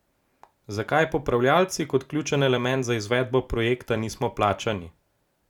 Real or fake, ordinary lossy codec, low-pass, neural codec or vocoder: real; none; 19.8 kHz; none